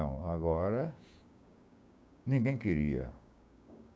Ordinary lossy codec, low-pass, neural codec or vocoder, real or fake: none; none; codec, 16 kHz, 6 kbps, DAC; fake